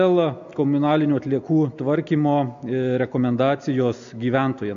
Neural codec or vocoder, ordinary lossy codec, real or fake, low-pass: none; MP3, 64 kbps; real; 7.2 kHz